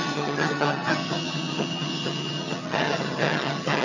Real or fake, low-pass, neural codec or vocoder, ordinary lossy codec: fake; 7.2 kHz; vocoder, 22.05 kHz, 80 mel bands, HiFi-GAN; none